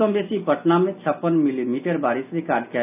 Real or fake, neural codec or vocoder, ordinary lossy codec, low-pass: real; none; none; 3.6 kHz